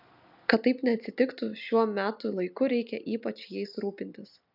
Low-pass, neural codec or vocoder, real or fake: 5.4 kHz; none; real